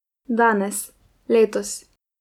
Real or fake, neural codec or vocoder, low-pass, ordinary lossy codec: real; none; 19.8 kHz; none